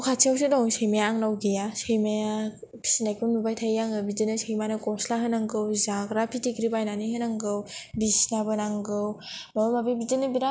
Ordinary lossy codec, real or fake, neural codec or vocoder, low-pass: none; real; none; none